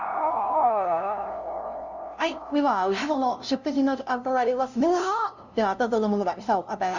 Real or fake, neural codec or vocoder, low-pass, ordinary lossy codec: fake; codec, 16 kHz, 0.5 kbps, FunCodec, trained on LibriTTS, 25 frames a second; 7.2 kHz; none